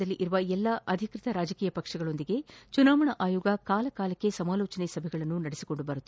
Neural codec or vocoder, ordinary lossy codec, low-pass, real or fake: none; none; none; real